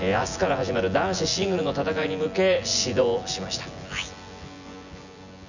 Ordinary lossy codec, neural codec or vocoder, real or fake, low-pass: none; vocoder, 24 kHz, 100 mel bands, Vocos; fake; 7.2 kHz